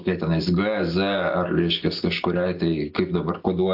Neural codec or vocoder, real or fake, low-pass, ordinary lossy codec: none; real; 5.4 kHz; AAC, 48 kbps